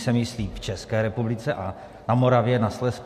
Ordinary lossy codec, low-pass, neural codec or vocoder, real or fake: MP3, 64 kbps; 14.4 kHz; none; real